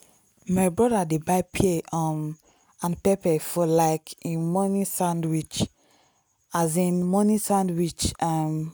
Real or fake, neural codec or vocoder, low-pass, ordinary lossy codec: real; none; none; none